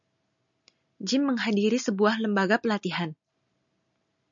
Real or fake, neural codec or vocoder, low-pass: real; none; 7.2 kHz